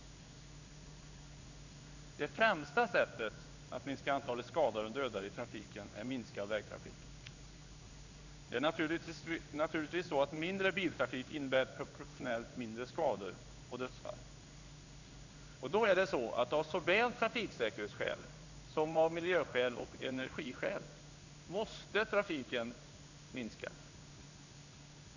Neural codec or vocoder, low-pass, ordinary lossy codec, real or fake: codec, 16 kHz in and 24 kHz out, 1 kbps, XY-Tokenizer; 7.2 kHz; none; fake